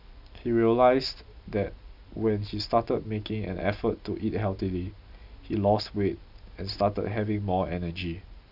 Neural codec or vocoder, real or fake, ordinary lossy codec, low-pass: none; real; none; 5.4 kHz